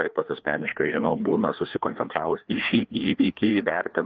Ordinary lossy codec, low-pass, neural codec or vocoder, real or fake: Opus, 24 kbps; 7.2 kHz; codec, 16 kHz, 2 kbps, FreqCodec, larger model; fake